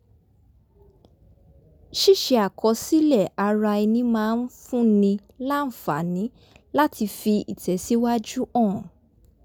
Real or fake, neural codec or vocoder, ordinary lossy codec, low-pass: real; none; none; none